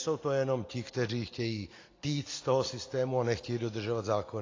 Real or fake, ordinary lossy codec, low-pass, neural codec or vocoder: real; AAC, 32 kbps; 7.2 kHz; none